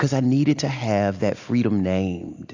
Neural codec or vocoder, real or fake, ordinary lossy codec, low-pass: none; real; AAC, 48 kbps; 7.2 kHz